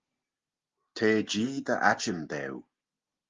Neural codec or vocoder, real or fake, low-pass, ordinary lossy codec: none; real; 7.2 kHz; Opus, 32 kbps